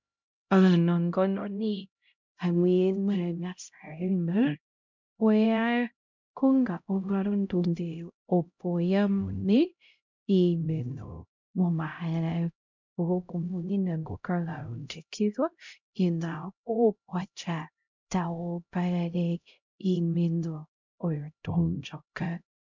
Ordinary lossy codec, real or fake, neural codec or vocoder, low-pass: MP3, 64 kbps; fake; codec, 16 kHz, 0.5 kbps, X-Codec, HuBERT features, trained on LibriSpeech; 7.2 kHz